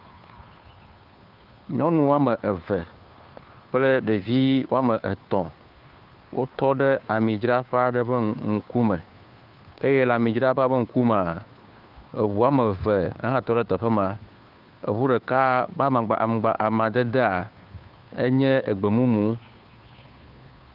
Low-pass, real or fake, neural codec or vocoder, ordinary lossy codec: 5.4 kHz; fake; codec, 16 kHz, 2 kbps, FunCodec, trained on Chinese and English, 25 frames a second; Opus, 32 kbps